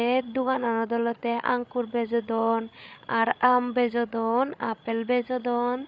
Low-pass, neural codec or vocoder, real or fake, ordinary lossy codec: none; codec, 16 kHz, 16 kbps, FreqCodec, larger model; fake; none